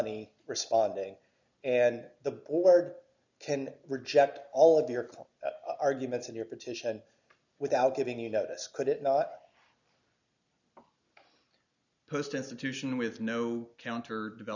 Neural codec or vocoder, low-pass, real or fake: none; 7.2 kHz; real